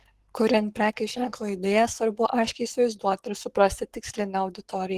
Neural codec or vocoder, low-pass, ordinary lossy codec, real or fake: codec, 44.1 kHz, 7.8 kbps, Pupu-Codec; 14.4 kHz; Opus, 16 kbps; fake